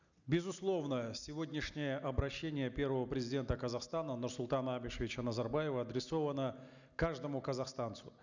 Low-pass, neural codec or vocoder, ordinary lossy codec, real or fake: 7.2 kHz; none; none; real